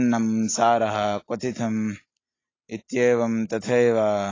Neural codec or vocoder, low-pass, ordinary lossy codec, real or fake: none; 7.2 kHz; AAC, 32 kbps; real